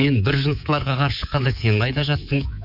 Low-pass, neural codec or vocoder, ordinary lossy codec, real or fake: 5.4 kHz; codec, 24 kHz, 3.1 kbps, DualCodec; none; fake